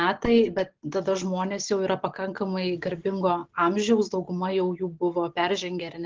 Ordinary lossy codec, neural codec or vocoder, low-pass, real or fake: Opus, 16 kbps; none; 7.2 kHz; real